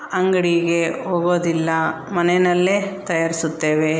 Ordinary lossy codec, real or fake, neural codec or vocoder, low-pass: none; real; none; none